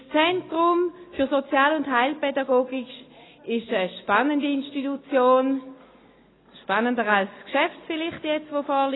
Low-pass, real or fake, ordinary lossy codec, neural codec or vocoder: 7.2 kHz; real; AAC, 16 kbps; none